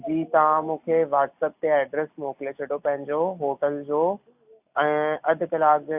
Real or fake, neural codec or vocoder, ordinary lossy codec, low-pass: real; none; AAC, 32 kbps; 3.6 kHz